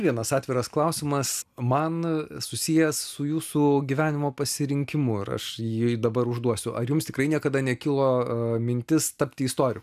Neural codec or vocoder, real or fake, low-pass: none; real; 14.4 kHz